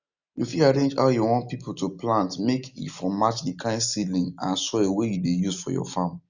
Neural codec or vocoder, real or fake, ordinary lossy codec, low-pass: none; real; none; 7.2 kHz